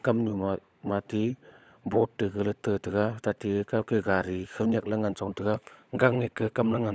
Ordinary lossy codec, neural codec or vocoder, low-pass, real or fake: none; codec, 16 kHz, 16 kbps, FunCodec, trained on LibriTTS, 50 frames a second; none; fake